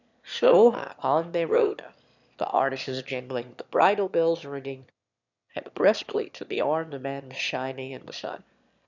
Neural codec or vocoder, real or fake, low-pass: autoencoder, 22.05 kHz, a latent of 192 numbers a frame, VITS, trained on one speaker; fake; 7.2 kHz